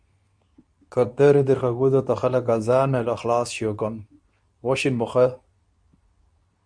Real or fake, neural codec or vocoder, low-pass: fake; codec, 24 kHz, 0.9 kbps, WavTokenizer, medium speech release version 2; 9.9 kHz